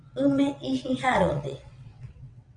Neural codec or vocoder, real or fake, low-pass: vocoder, 22.05 kHz, 80 mel bands, WaveNeXt; fake; 9.9 kHz